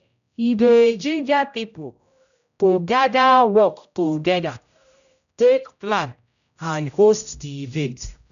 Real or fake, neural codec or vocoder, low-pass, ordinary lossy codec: fake; codec, 16 kHz, 0.5 kbps, X-Codec, HuBERT features, trained on general audio; 7.2 kHz; none